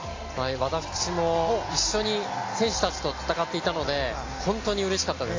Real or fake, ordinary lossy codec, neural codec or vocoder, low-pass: real; AAC, 32 kbps; none; 7.2 kHz